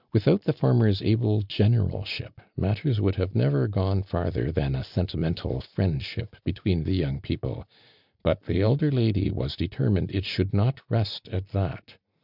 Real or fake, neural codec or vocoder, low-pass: real; none; 5.4 kHz